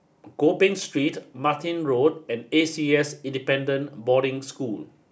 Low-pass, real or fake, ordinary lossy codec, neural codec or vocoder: none; real; none; none